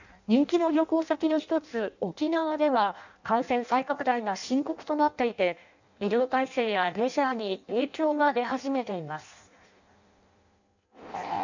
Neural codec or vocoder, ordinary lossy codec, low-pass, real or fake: codec, 16 kHz in and 24 kHz out, 0.6 kbps, FireRedTTS-2 codec; none; 7.2 kHz; fake